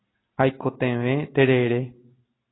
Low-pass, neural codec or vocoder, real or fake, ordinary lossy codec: 7.2 kHz; none; real; AAC, 16 kbps